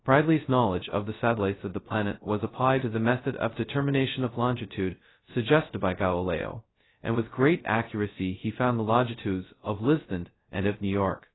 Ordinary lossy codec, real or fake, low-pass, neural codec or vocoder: AAC, 16 kbps; fake; 7.2 kHz; codec, 16 kHz, 0.2 kbps, FocalCodec